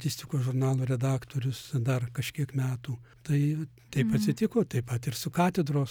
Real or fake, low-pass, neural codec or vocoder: real; 19.8 kHz; none